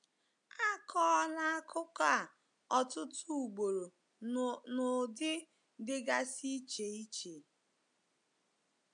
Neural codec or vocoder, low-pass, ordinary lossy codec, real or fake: none; 9.9 kHz; AAC, 64 kbps; real